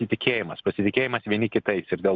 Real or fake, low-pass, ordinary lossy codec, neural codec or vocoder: real; 7.2 kHz; Opus, 64 kbps; none